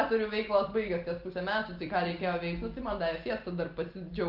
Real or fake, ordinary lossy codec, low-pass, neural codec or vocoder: real; Opus, 32 kbps; 5.4 kHz; none